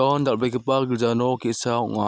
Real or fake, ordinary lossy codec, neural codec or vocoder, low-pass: real; none; none; none